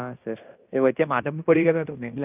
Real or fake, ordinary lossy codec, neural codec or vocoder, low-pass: fake; none; codec, 16 kHz in and 24 kHz out, 0.9 kbps, LongCat-Audio-Codec, fine tuned four codebook decoder; 3.6 kHz